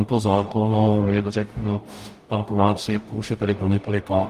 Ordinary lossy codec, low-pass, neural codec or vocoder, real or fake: Opus, 24 kbps; 14.4 kHz; codec, 44.1 kHz, 0.9 kbps, DAC; fake